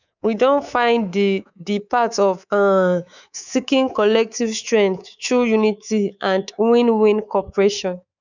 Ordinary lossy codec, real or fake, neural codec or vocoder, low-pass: none; fake; codec, 24 kHz, 3.1 kbps, DualCodec; 7.2 kHz